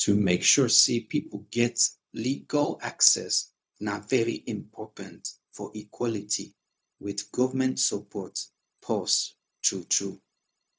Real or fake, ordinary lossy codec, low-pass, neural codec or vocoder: fake; none; none; codec, 16 kHz, 0.4 kbps, LongCat-Audio-Codec